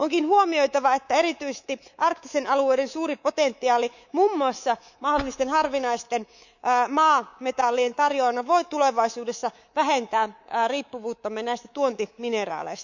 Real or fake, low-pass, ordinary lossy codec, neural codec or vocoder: fake; 7.2 kHz; none; codec, 24 kHz, 3.1 kbps, DualCodec